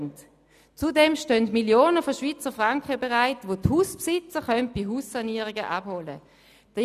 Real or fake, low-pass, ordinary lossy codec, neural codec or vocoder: real; 14.4 kHz; none; none